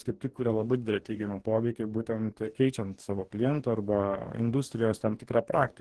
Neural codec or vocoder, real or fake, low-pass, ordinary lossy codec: codec, 44.1 kHz, 2.6 kbps, DAC; fake; 10.8 kHz; Opus, 16 kbps